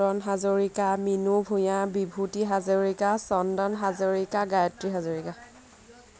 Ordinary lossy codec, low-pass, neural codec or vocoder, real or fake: none; none; none; real